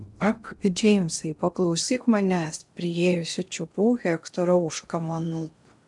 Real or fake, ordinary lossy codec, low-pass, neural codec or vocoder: fake; MP3, 96 kbps; 10.8 kHz; codec, 16 kHz in and 24 kHz out, 0.6 kbps, FocalCodec, streaming, 2048 codes